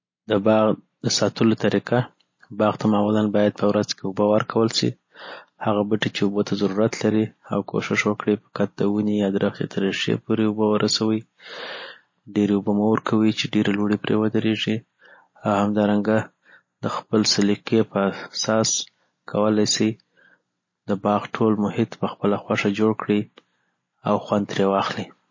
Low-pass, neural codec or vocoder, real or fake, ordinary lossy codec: 7.2 kHz; none; real; MP3, 32 kbps